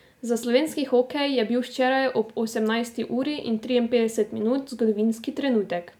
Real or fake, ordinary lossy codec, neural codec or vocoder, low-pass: real; none; none; 19.8 kHz